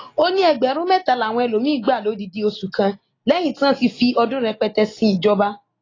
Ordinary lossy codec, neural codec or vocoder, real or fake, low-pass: AAC, 32 kbps; none; real; 7.2 kHz